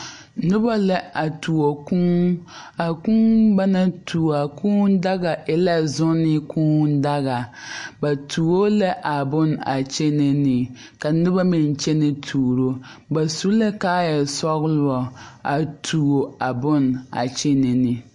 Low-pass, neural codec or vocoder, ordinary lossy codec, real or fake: 10.8 kHz; none; MP3, 48 kbps; real